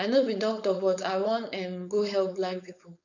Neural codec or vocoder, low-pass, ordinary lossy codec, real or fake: codec, 16 kHz, 4.8 kbps, FACodec; 7.2 kHz; none; fake